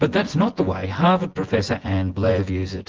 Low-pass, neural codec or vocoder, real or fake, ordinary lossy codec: 7.2 kHz; vocoder, 24 kHz, 100 mel bands, Vocos; fake; Opus, 16 kbps